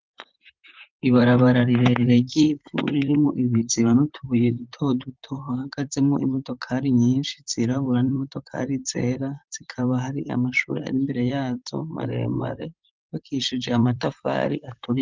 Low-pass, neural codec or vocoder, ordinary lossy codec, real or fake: 7.2 kHz; vocoder, 44.1 kHz, 128 mel bands, Pupu-Vocoder; Opus, 24 kbps; fake